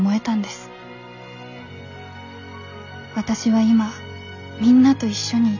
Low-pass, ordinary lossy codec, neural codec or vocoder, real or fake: 7.2 kHz; none; none; real